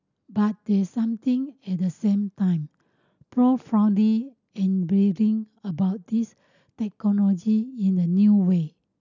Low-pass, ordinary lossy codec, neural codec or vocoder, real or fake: 7.2 kHz; none; none; real